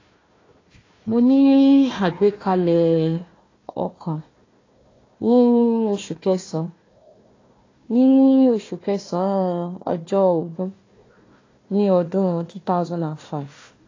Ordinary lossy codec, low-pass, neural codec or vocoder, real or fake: AAC, 32 kbps; 7.2 kHz; codec, 16 kHz, 1 kbps, FunCodec, trained on Chinese and English, 50 frames a second; fake